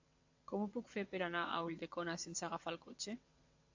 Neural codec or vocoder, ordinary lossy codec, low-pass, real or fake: vocoder, 22.05 kHz, 80 mel bands, WaveNeXt; AAC, 48 kbps; 7.2 kHz; fake